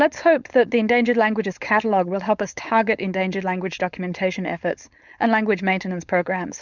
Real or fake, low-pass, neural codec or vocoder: fake; 7.2 kHz; codec, 16 kHz, 4.8 kbps, FACodec